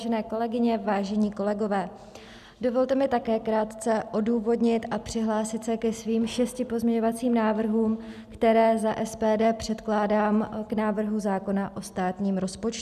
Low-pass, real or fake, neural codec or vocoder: 14.4 kHz; real; none